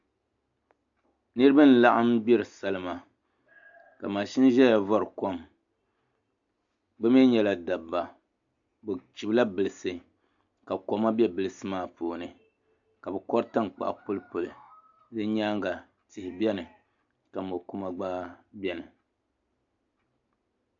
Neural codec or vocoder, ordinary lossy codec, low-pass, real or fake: none; AAC, 64 kbps; 7.2 kHz; real